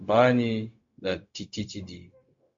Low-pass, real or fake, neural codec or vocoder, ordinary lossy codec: 7.2 kHz; fake; codec, 16 kHz, 0.4 kbps, LongCat-Audio-Codec; MP3, 48 kbps